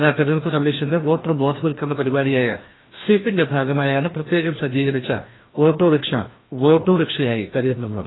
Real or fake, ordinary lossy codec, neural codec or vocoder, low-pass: fake; AAC, 16 kbps; codec, 16 kHz, 1 kbps, FreqCodec, larger model; 7.2 kHz